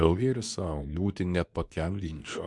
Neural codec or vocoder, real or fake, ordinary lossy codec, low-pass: codec, 24 kHz, 0.9 kbps, WavTokenizer, medium speech release version 2; fake; MP3, 96 kbps; 10.8 kHz